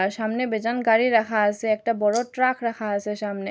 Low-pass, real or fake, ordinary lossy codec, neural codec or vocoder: none; real; none; none